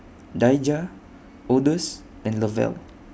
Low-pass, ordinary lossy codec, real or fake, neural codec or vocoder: none; none; real; none